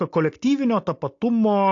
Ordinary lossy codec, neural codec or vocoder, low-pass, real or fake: AAC, 48 kbps; none; 7.2 kHz; real